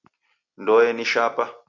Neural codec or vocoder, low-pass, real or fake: none; 7.2 kHz; real